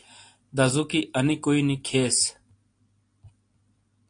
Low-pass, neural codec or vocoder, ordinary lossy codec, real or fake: 9.9 kHz; none; AAC, 48 kbps; real